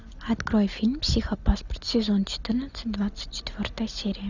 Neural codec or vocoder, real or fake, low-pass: none; real; 7.2 kHz